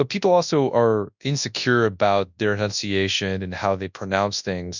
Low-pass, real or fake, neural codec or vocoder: 7.2 kHz; fake; codec, 24 kHz, 0.9 kbps, WavTokenizer, large speech release